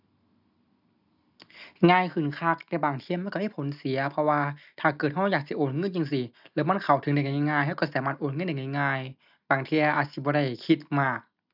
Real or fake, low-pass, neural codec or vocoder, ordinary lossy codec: real; 5.4 kHz; none; none